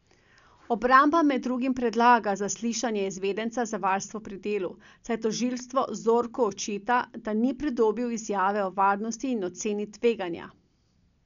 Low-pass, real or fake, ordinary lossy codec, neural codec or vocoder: 7.2 kHz; real; none; none